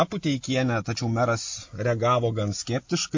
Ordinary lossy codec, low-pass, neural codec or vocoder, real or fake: MP3, 48 kbps; 7.2 kHz; none; real